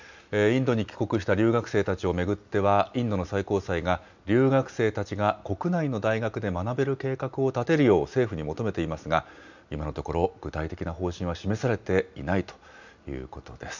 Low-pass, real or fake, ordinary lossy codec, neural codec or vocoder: 7.2 kHz; real; none; none